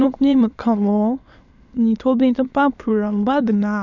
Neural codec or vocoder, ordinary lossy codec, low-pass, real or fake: autoencoder, 22.05 kHz, a latent of 192 numbers a frame, VITS, trained on many speakers; none; 7.2 kHz; fake